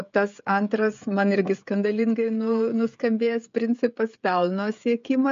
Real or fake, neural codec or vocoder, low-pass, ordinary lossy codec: fake; codec, 16 kHz, 16 kbps, FreqCodec, smaller model; 7.2 kHz; MP3, 48 kbps